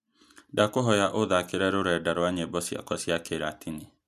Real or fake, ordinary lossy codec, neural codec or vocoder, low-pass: real; none; none; 14.4 kHz